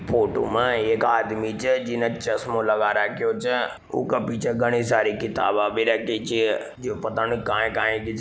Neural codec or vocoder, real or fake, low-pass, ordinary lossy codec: none; real; none; none